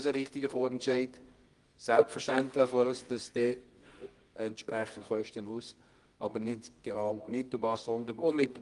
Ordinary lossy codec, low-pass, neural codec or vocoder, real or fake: Opus, 32 kbps; 10.8 kHz; codec, 24 kHz, 0.9 kbps, WavTokenizer, medium music audio release; fake